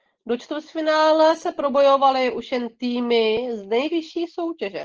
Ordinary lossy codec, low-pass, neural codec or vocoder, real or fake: Opus, 16 kbps; 7.2 kHz; none; real